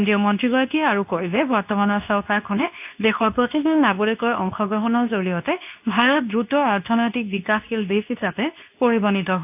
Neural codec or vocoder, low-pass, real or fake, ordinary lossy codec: codec, 24 kHz, 0.9 kbps, WavTokenizer, medium speech release version 2; 3.6 kHz; fake; AAC, 32 kbps